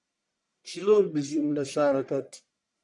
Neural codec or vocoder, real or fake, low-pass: codec, 44.1 kHz, 1.7 kbps, Pupu-Codec; fake; 10.8 kHz